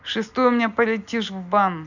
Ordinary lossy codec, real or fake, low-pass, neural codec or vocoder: none; real; 7.2 kHz; none